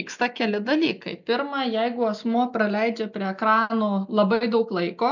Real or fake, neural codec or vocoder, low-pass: real; none; 7.2 kHz